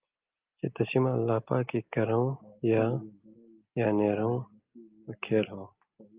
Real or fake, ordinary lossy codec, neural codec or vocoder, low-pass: real; Opus, 24 kbps; none; 3.6 kHz